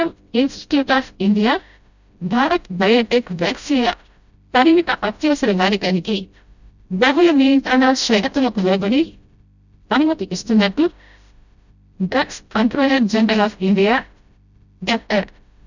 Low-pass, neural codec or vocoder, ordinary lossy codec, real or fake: 7.2 kHz; codec, 16 kHz, 0.5 kbps, FreqCodec, smaller model; none; fake